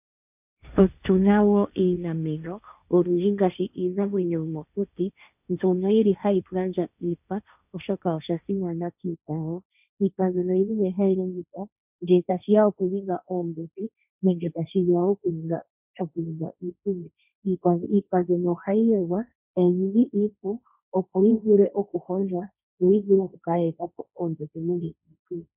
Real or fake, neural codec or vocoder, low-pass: fake; codec, 16 kHz, 1.1 kbps, Voila-Tokenizer; 3.6 kHz